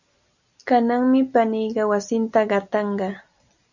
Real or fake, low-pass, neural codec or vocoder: real; 7.2 kHz; none